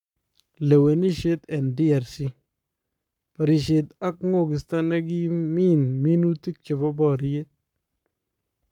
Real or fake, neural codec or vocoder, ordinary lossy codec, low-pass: fake; codec, 44.1 kHz, 7.8 kbps, Pupu-Codec; none; 19.8 kHz